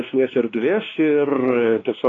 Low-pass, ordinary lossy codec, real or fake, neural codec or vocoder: 7.2 kHz; AAC, 32 kbps; fake; codec, 16 kHz, 2 kbps, X-Codec, WavLM features, trained on Multilingual LibriSpeech